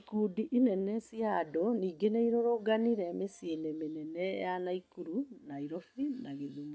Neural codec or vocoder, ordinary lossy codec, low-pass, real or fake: none; none; none; real